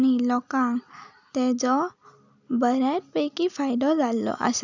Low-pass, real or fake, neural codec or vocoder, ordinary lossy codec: 7.2 kHz; real; none; none